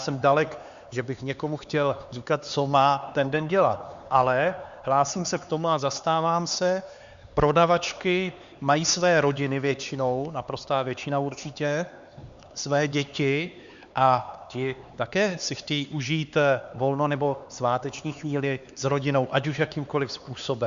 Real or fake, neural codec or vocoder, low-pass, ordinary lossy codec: fake; codec, 16 kHz, 4 kbps, X-Codec, HuBERT features, trained on LibriSpeech; 7.2 kHz; Opus, 64 kbps